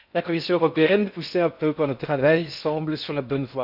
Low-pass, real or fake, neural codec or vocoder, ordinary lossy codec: 5.4 kHz; fake; codec, 16 kHz in and 24 kHz out, 0.6 kbps, FocalCodec, streaming, 4096 codes; none